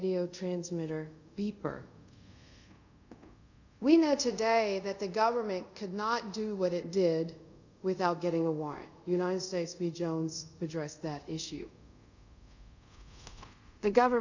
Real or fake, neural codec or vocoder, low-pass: fake; codec, 24 kHz, 0.5 kbps, DualCodec; 7.2 kHz